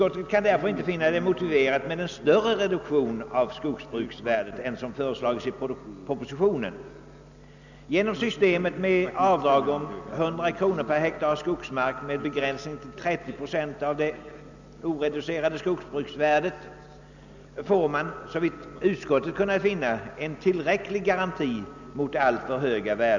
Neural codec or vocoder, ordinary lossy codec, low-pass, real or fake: none; none; 7.2 kHz; real